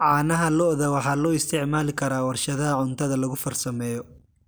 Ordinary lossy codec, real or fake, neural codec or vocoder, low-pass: none; real; none; none